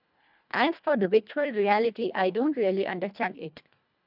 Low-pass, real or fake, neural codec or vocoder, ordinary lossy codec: 5.4 kHz; fake; codec, 24 kHz, 1.5 kbps, HILCodec; none